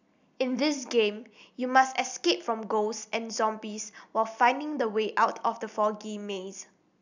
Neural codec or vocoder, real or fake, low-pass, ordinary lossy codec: none; real; 7.2 kHz; none